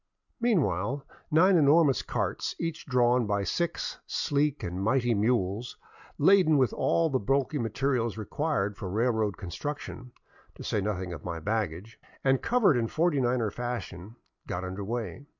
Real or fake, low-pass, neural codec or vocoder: real; 7.2 kHz; none